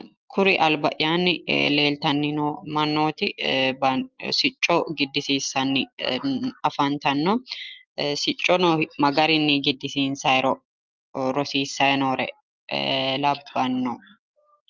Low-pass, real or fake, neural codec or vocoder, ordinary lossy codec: 7.2 kHz; real; none; Opus, 24 kbps